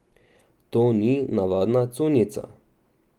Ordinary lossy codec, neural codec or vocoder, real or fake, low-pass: Opus, 24 kbps; none; real; 19.8 kHz